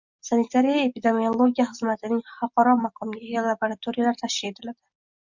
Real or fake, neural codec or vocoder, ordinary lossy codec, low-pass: real; none; MP3, 64 kbps; 7.2 kHz